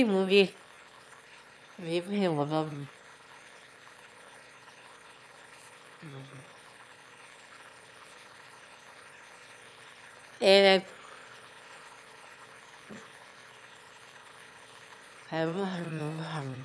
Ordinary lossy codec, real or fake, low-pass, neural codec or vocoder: none; fake; none; autoencoder, 22.05 kHz, a latent of 192 numbers a frame, VITS, trained on one speaker